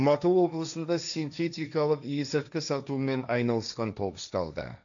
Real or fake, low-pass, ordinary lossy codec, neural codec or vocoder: fake; 7.2 kHz; MP3, 96 kbps; codec, 16 kHz, 1.1 kbps, Voila-Tokenizer